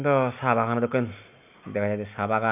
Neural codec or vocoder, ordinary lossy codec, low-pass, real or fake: none; none; 3.6 kHz; real